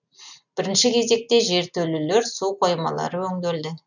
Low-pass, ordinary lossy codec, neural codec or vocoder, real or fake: 7.2 kHz; none; none; real